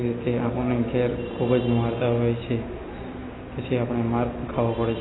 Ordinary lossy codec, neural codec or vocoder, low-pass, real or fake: AAC, 16 kbps; none; 7.2 kHz; real